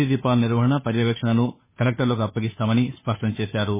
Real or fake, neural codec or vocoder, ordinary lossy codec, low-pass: fake; codec, 16 kHz, 2 kbps, FunCodec, trained on Chinese and English, 25 frames a second; MP3, 16 kbps; 3.6 kHz